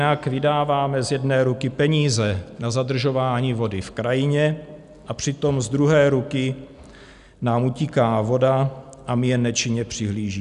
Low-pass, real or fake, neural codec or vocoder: 10.8 kHz; real; none